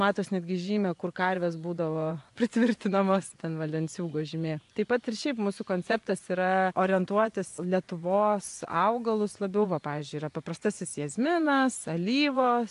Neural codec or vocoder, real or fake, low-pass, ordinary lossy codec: vocoder, 24 kHz, 100 mel bands, Vocos; fake; 10.8 kHz; AAC, 64 kbps